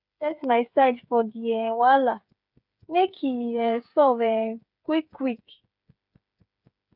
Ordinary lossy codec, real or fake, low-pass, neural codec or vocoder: none; fake; 5.4 kHz; codec, 16 kHz, 8 kbps, FreqCodec, smaller model